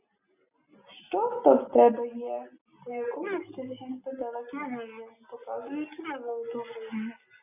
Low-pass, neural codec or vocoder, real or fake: 3.6 kHz; none; real